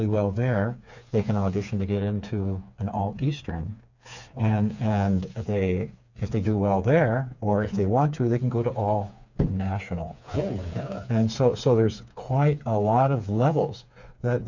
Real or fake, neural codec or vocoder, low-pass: fake; codec, 16 kHz, 4 kbps, FreqCodec, smaller model; 7.2 kHz